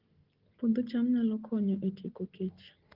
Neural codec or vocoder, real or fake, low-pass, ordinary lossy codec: none; real; 5.4 kHz; Opus, 16 kbps